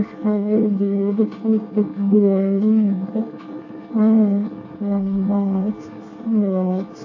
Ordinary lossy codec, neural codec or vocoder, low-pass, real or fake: AAC, 48 kbps; codec, 24 kHz, 1 kbps, SNAC; 7.2 kHz; fake